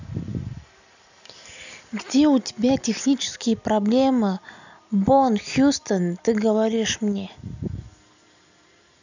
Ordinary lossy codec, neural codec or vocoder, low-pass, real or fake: none; none; 7.2 kHz; real